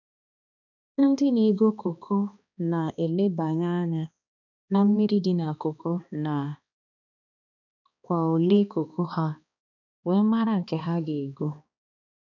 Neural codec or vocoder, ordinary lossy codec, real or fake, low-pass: codec, 16 kHz, 2 kbps, X-Codec, HuBERT features, trained on balanced general audio; none; fake; 7.2 kHz